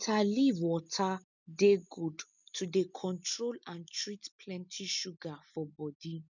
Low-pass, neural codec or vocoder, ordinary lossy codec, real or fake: 7.2 kHz; none; none; real